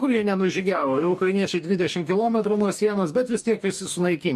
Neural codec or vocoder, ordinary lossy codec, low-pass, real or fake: codec, 44.1 kHz, 2.6 kbps, DAC; MP3, 64 kbps; 14.4 kHz; fake